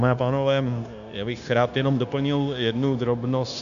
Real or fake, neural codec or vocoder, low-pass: fake; codec, 16 kHz, 0.9 kbps, LongCat-Audio-Codec; 7.2 kHz